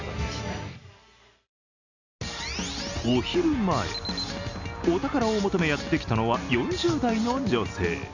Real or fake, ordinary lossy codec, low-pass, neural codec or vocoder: real; none; 7.2 kHz; none